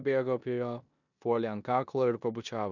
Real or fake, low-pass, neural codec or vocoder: fake; 7.2 kHz; codec, 16 kHz in and 24 kHz out, 0.9 kbps, LongCat-Audio-Codec, four codebook decoder